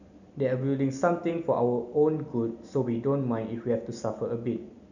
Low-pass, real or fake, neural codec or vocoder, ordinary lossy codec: 7.2 kHz; real; none; none